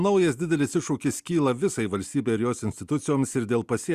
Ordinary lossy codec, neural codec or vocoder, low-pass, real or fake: Opus, 64 kbps; none; 14.4 kHz; real